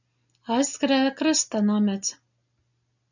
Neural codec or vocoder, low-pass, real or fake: none; 7.2 kHz; real